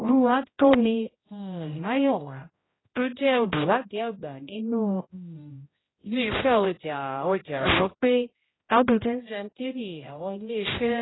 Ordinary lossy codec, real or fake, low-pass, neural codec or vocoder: AAC, 16 kbps; fake; 7.2 kHz; codec, 16 kHz, 0.5 kbps, X-Codec, HuBERT features, trained on general audio